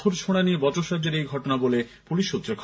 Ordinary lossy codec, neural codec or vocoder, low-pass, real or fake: none; none; none; real